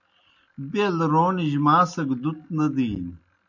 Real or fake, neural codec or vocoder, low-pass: real; none; 7.2 kHz